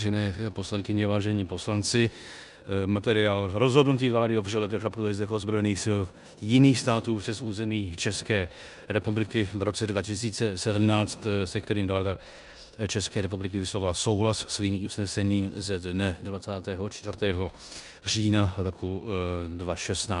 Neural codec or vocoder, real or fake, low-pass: codec, 16 kHz in and 24 kHz out, 0.9 kbps, LongCat-Audio-Codec, four codebook decoder; fake; 10.8 kHz